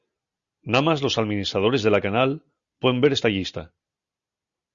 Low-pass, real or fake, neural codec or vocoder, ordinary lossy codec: 7.2 kHz; real; none; Opus, 64 kbps